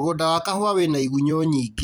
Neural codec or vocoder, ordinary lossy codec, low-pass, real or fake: vocoder, 44.1 kHz, 128 mel bands every 256 samples, BigVGAN v2; none; none; fake